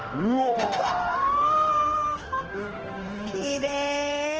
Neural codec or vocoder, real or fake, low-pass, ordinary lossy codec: autoencoder, 48 kHz, 32 numbers a frame, DAC-VAE, trained on Japanese speech; fake; 7.2 kHz; Opus, 16 kbps